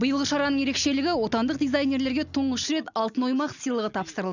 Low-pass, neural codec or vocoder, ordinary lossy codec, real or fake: 7.2 kHz; none; none; real